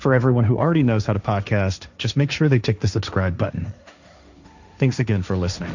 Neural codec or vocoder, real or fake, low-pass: codec, 16 kHz, 1.1 kbps, Voila-Tokenizer; fake; 7.2 kHz